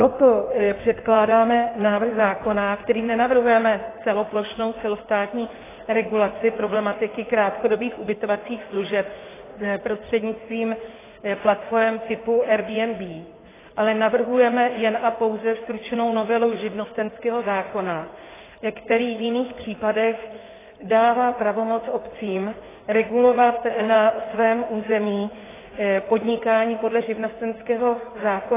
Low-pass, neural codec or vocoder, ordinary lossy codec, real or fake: 3.6 kHz; codec, 16 kHz in and 24 kHz out, 2.2 kbps, FireRedTTS-2 codec; AAC, 16 kbps; fake